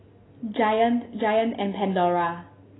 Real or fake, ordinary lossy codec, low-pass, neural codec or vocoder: real; AAC, 16 kbps; 7.2 kHz; none